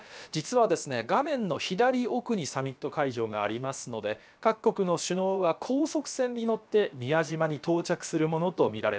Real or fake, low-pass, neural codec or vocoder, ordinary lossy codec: fake; none; codec, 16 kHz, about 1 kbps, DyCAST, with the encoder's durations; none